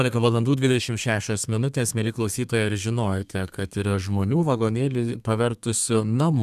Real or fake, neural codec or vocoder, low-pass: fake; codec, 32 kHz, 1.9 kbps, SNAC; 14.4 kHz